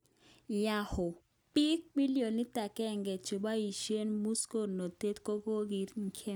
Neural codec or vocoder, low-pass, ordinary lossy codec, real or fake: none; none; none; real